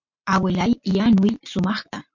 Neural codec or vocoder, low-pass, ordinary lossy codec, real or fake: none; 7.2 kHz; MP3, 48 kbps; real